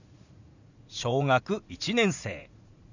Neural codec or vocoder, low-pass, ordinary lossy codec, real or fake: vocoder, 44.1 kHz, 80 mel bands, Vocos; 7.2 kHz; none; fake